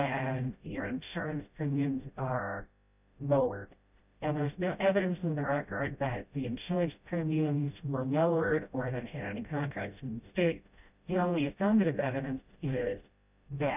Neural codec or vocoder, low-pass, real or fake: codec, 16 kHz, 0.5 kbps, FreqCodec, smaller model; 3.6 kHz; fake